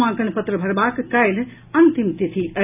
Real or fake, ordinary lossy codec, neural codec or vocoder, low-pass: real; none; none; 3.6 kHz